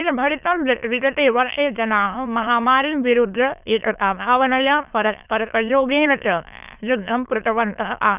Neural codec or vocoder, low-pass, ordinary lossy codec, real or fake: autoencoder, 22.05 kHz, a latent of 192 numbers a frame, VITS, trained on many speakers; 3.6 kHz; none; fake